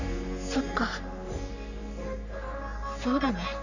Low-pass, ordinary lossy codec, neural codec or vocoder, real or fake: 7.2 kHz; none; codec, 44.1 kHz, 3.4 kbps, Pupu-Codec; fake